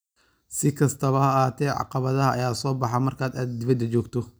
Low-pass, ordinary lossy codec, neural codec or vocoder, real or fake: none; none; none; real